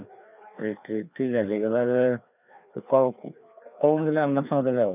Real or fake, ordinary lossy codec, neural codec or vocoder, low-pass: fake; none; codec, 16 kHz, 2 kbps, FreqCodec, larger model; 3.6 kHz